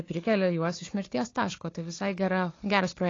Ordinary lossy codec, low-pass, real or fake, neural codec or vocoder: AAC, 32 kbps; 7.2 kHz; fake; codec, 16 kHz, 4 kbps, FunCodec, trained on Chinese and English, 50 frames a second